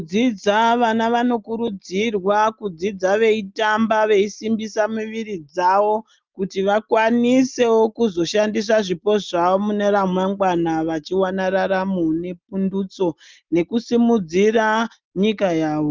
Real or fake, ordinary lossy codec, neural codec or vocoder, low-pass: real; Opus, 24 kbps; none; 7.2 kHz